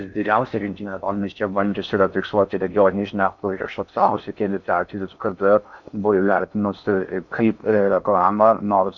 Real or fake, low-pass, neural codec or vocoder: fake; 7.2 kHz; codec, 16 kHz in and 24 kHz out, 0.6 kbps, FocalCodec, streaming, 4096 codes